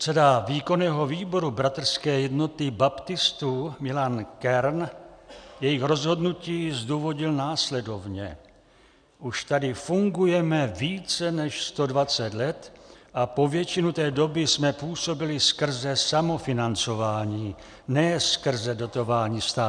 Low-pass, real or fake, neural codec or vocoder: 9.9 kHz; real; none